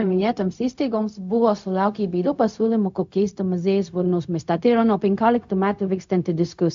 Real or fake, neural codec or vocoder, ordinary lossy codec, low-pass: fake; codec, 16 kHz, 0.4 kbps, LongCat-Audio-Codec; AAC, 64 kbps; 7.2 kHz